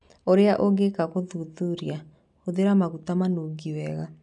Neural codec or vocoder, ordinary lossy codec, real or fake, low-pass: none; none; real; 10.8 kHz